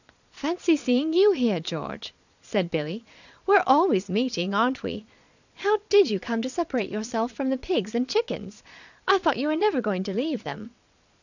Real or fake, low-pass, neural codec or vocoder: fake; 7.2 kHz; vocoder, 22.05 kHz, 80 mel bands, Vocos